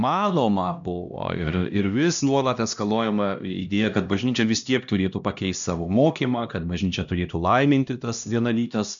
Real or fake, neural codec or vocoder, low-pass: fake; codec, 16 kHz, 1 kbps, X-Codec, HuBERT features, trained on LibriSpeech; 7.2 kHz